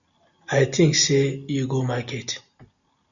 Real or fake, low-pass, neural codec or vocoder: real; 7.2 kHz; none